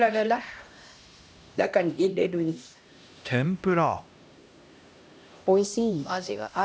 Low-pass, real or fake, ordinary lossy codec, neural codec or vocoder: none; fake; none; codec, 16 kHz, 1 kbps, X-Codec, HuBERT features, trained on LibriSpeech